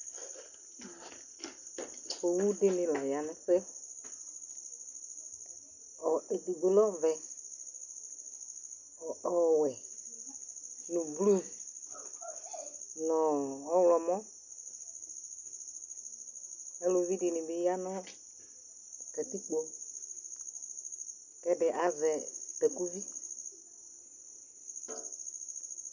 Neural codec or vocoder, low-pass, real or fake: none; 7.2 kHz; real